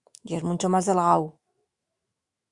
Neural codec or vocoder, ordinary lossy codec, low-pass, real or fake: autoencoder, 48 kHz, 128 numbers a frame, DAC-VAE, trained on Japanese speech; Opus, 64 kbps; 10.8 kHz; fake